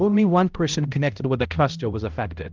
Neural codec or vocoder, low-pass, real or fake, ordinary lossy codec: codec, 16 kHz, 0.5 kbps, X-Codec, HuBERT features, trained on balanced general audio; 7.2 kHz; fake; Opus, 32 kbps